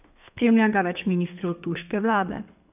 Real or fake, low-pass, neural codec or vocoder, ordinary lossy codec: fake; 3.6 kHz; codec, 32 kHz, 1.9 kbps, SNAC; none